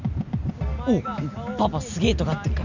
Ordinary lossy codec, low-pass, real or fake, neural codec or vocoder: none; 7.2 kHz; real; none